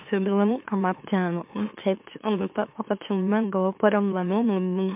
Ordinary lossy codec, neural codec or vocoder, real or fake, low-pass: MP3, 32 kbps; autoencoder, 44.1 kHz, a latent of 192 numbers a frame, MeloTTS; fake; 3.6 kHz